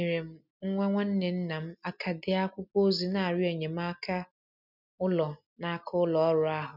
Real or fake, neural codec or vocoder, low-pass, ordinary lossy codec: real; none; 5.4 kHz; none